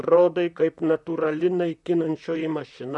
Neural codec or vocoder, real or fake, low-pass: vocoder, 44.1 kHz, 128 mel bands, Pupu-Vocoder; fake; 10.8 kHz